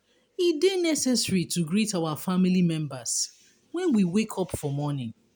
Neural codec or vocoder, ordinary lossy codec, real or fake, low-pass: none; none; real; none